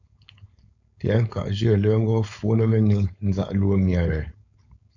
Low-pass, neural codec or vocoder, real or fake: 7.2 kHz; codec, 16 kHz, 4.8 kbps, FACodec; fake